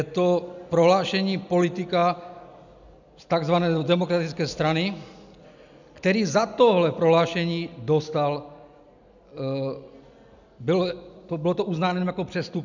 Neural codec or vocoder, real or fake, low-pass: none; real; 7.2 kHz